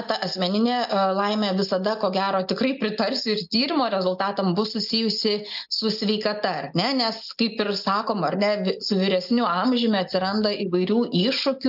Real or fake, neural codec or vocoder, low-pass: real; none; 5.4 kHz